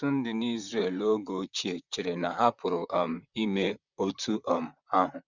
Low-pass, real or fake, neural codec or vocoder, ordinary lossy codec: 7.2 kHz; fake; vocoder, 44.1 kHz, 128 mel bands, Pupu-Vocoder; none